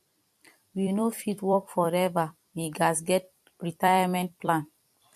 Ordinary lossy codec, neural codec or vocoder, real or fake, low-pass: MP3, 64 kbps; vocoder, 44.1 kHz, 128 mel bands every 256 samples, BigVGAN v2; fake; 14.4 kHz